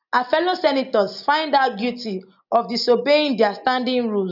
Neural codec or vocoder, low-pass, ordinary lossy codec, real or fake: none; 5.4 kHz; none; real